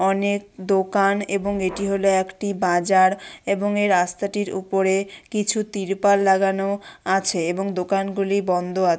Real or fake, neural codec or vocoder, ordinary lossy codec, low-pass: real; none; none; none